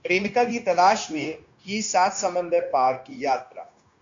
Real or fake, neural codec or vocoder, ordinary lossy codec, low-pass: fake; codec, 16 kHz, 0.9 kbps, LongCat-Audio-Codec; AAC, 64 kbps; 7.2 kHz